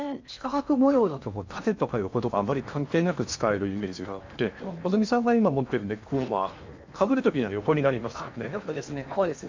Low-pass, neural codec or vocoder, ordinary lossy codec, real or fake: 7.2 kHz; codec, 16 kHz in and 24 kHz out, 0.8 kbps, FocalCodec, streaming, 65536 codes; MP3, 64 kbps; fake